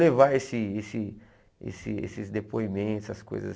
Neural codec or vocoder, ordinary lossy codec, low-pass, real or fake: none; none; none; real